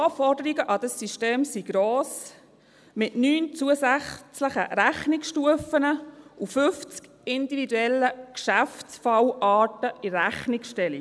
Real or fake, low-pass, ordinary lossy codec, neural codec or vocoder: real; none; none; none